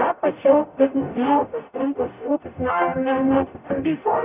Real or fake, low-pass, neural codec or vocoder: fake; 3.6 kHz; codec, 44.1 kHz, 0.9 kbps, DAC